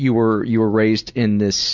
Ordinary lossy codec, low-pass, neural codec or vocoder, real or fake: Opus, 64 kbps; 7.2 kHz; none; real